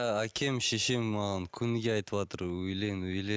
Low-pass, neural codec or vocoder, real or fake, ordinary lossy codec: none; none; real; none